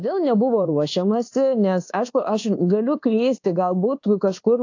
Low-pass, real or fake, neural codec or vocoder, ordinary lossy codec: 7.2 kHz; fake; codec, 24 kHz, 1.2 kbps, DualCodec; AAC, 48 kbps